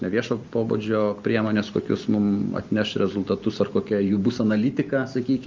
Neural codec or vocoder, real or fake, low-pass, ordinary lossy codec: none; real; 7.2 kHz; Opus, 24 kbps